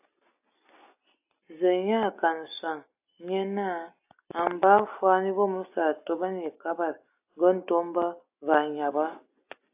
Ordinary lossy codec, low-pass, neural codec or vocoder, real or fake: AAC, 32 kbps; 3.6 kHz; none; real